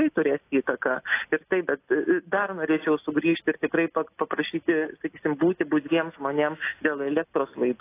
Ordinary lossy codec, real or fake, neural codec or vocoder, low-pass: AAC, 24 kbps; real; none; 3.6 kHz